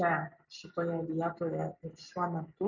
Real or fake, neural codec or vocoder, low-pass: real; none; 7.2 kHz